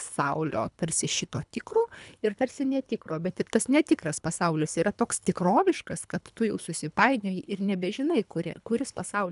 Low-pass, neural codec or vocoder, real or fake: 10.8 kHz; codec, 24 kHz, 3 kbps, HILCodec; fake